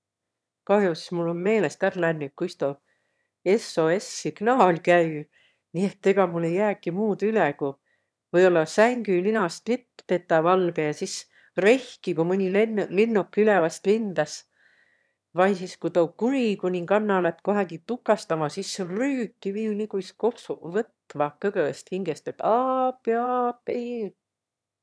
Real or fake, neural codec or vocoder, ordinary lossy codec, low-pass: fake; autoencoder, 22.05 kHz, a latent of 192 numbers a frame, VITS, trained on one speaker; none; none